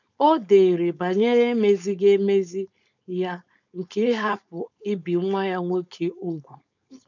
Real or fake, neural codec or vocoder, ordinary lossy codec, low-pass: fake; codec, 16 kHz, 4.8 kbps, FACodec; none; 7.2 kHz